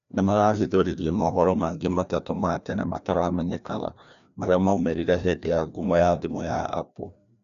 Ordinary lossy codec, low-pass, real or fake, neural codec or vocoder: none; 7.2 kHz; fake; codec, 16 kHz, 1 kbps, FreqCodec, larger model